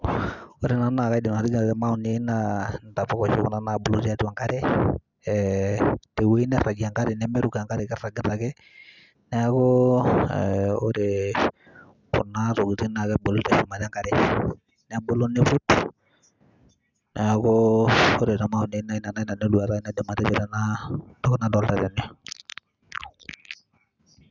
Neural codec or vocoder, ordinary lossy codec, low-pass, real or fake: none; none; 7.2 kHz; real